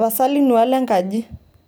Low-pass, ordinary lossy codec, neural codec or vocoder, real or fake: none; none; none; real